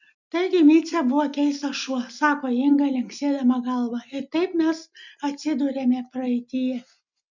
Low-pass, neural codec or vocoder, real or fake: 7.2 kHz; none; real